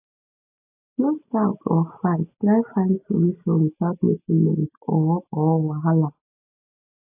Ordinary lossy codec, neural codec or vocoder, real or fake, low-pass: AAC, 32 kbps; none; real; 3.6 kHz